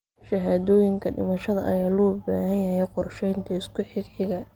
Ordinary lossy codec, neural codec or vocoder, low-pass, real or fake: Opus, 24 kbps; none; 19.8 kHz; real